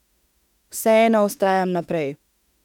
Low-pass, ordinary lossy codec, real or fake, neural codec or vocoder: 19.8 kHz; none; fake; autoencoder, 48 kHz, 32 numbers a frame, DAC-VAE, trained on Japanese speech